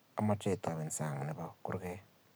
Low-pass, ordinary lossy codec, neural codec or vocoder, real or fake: none; none; none; real